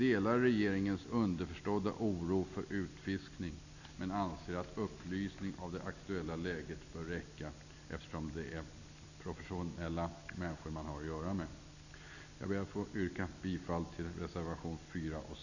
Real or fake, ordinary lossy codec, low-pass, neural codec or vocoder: real; none; 7.2 kHz; none